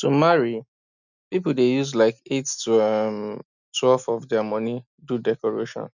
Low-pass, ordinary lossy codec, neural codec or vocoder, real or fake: 7.2 kHz; none; none; real